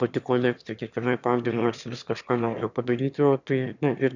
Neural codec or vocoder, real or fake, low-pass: autoencoder, 22.05 kHz, a latent of 192 numbers a frame, VITS, trained on one speaker; fake; 7.2 kHz